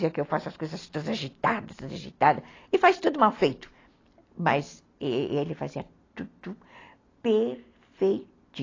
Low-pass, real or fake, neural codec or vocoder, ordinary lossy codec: 7.2 kHz; real; none; AAC, 32 kbps